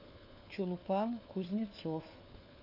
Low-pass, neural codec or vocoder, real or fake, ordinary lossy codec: 5.4 kHz; codec, 16 kHz, 4 kbps, FunCodec, trained on LibriTTS, 50 frames a second; fake; AAC, 32 kbps